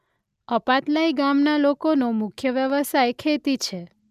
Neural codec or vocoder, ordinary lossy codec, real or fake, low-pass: none; none; real; 14.4 kHz